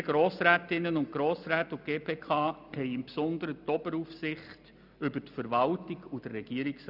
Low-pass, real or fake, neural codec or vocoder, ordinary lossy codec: 5.4 kHz; real; none; none